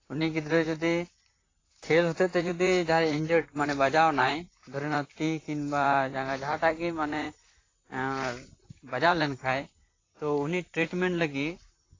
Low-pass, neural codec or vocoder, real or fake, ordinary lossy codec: 7.2 kHz; vocoder, 44.1 kHz, 128 mel bands, Pupu-Vocoder; fake; AAC, 32 kbps